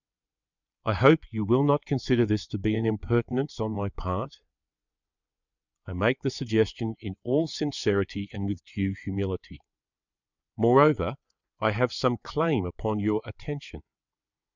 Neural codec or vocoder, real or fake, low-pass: vocoder, 22.05 kHz, 80 mel bands, WaveNeXt; fake; 7.2 kHz